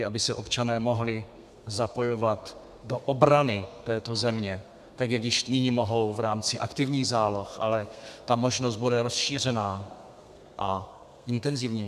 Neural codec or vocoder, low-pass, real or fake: codec, 32 kHz, 1.9 kbps, SNAC; 14.4 kHz; fake